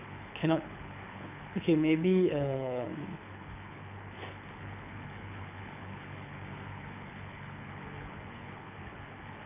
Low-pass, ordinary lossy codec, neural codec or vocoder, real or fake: 3.6 kHz; none; codec, 24 kHz, 6 kbps, HILCodec; fake